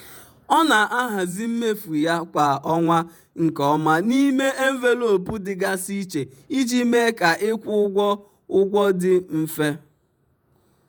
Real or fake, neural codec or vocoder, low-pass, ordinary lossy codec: fake; vocoder, 48 kHz, 128 mel bands, Vocos; none; none